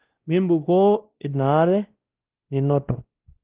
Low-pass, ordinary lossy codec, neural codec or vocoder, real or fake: 3.6 kHz; Opus, 16 kbps; codec, 16 kHz, 1 kbps, X-Codec, WavLM features, trained on Multilingual LibriSpeech; fake